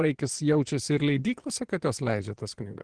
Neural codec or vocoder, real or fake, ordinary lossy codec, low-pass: vocoder, 24 kHz, 100 mel bands, Vocos; fake; Opus, 16 kbps; 9.9 kHz